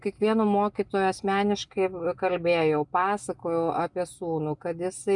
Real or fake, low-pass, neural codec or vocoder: real; 10.8 kHz; none